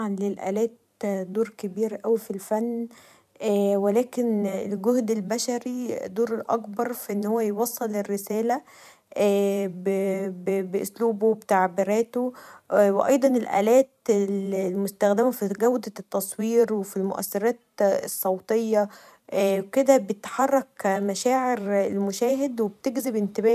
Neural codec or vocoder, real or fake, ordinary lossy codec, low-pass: vocoder, 44.1 kHz, 128 mel bands every 512 samples, BigVGAN v2; fake; none; 14.4 kHz